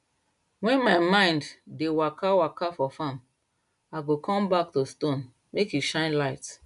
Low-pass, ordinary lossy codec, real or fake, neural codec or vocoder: 10.8 kHz; none; real; none